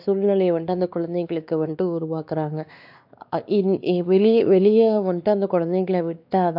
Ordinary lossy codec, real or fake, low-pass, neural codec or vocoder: AAC, 48 kbps; fake; 5.4 kHz; codec, 16 kHz, 2 kbps, X-Codec, WavLM features, trained on Multilingual LibriSpeech